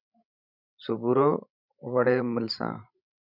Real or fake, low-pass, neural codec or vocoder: fake; 5.4 kHz; codec, 16 kHz, 16 kbps, FreqCodec, larger model